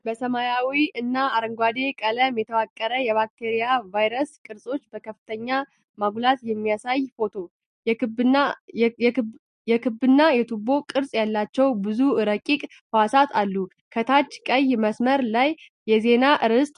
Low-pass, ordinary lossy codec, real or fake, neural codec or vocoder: 10.8 kHz; MP3, 48 kbps; real; none